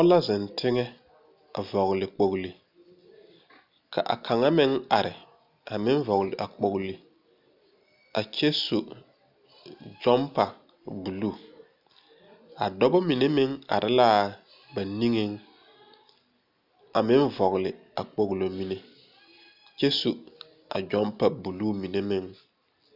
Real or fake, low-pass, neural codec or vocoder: real; 5.4 kHz; none